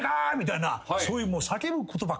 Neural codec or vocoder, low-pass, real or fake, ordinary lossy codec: none; none; real; none